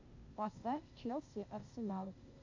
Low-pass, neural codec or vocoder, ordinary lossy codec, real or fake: 7.2 kHz; codec, 16 kHz, 0.8 kbps, ZipCodec; MP3, 64 kbps; fake